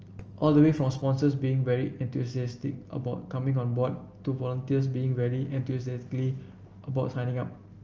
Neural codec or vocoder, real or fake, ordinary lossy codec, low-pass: none; real; Opus, 24 kbps; 7.2 kHz